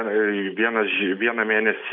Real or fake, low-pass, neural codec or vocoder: real; 5.4 kHz; none